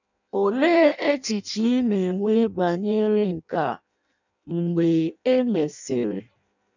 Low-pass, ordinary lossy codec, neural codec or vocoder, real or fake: 7.2 kHz; none; codec, 16 kHz in and 24 kHz out, 0.6 kbps, FireRedTTS-2 codec; fake